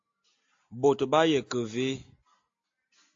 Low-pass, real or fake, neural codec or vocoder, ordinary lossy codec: 7.2 kHz; real; none; AAC, 48 kbps